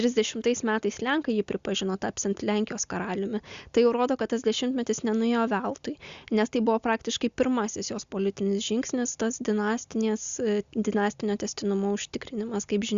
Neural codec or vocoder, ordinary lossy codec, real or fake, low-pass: none; Opus, 64 kbps; real; 7.2 kHz